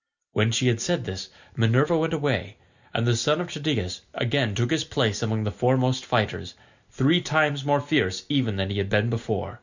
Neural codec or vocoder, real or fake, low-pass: none; real; 7.2 kHz